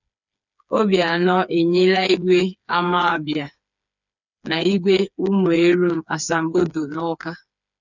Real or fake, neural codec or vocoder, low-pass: fake; codec, 16 kHz, 4 kbps, FreqCodec, smaller model; 7.2 kHz